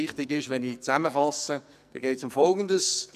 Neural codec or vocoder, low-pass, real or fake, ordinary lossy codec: codec, 44.1 kHz, 2.6 kbps, SNAC; 14.4 kHz; fake; none